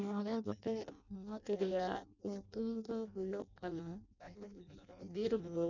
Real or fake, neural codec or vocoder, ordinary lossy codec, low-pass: fake; codec, 16 kHz in and 24 kHz out, 0.6 kbps, FireRedTTS-2 codec; none; 7.2 kHz